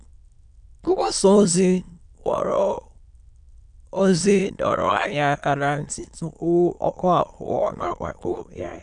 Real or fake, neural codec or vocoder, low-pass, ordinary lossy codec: fake; autoencoder, 22.05 kHz, a latent of 192 numbers a frame, VITS, trained on many speakers; 9.9 kHz; none